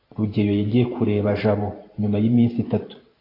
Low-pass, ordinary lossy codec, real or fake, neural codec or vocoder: 5.4 kHz; AAC, 24 kbps; fake; vocoder, 44.1 kHz, 128 mel bands every 512 samples, BigVGAN v2